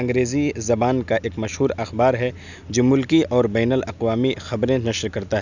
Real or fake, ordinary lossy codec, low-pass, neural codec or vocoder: real; none; 7.2 kHz; none